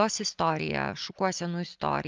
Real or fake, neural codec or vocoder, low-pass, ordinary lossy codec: real; none; 7.2 kHz; Opus, 24 kbps